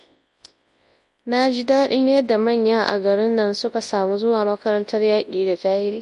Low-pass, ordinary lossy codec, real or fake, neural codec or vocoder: 10.8 kHz; MP3, 48 kbps; fake; codec, 24 kHz, 0.9 kbps, WavTokenizer, large speech release